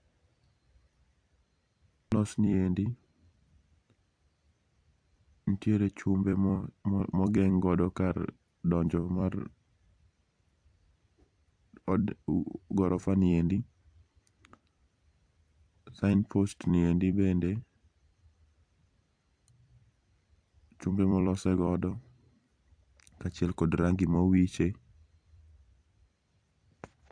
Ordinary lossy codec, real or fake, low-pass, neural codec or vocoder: AAC, 64 kbps; fake; 9.9 kHz; vocoder, 44.1 kHz, 128 mel bands every 256 samples, BigVGAN v2